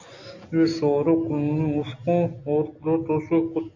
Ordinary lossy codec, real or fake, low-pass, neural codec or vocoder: AAC, 48 kbps; real; 7.2 kHz; none